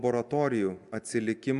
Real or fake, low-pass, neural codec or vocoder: real; 10.8 kHz; none